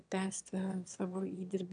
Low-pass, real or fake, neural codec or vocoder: 9.9 kHz; fake; autoencoder, 22.05 kHz, a latent of 192 numbers a frame, VITS, trained on one speaker